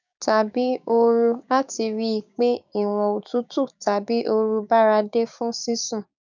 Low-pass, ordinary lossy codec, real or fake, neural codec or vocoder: 7.2 kHz; none; fake; codec, 16 kHz, 6 kbps, DAC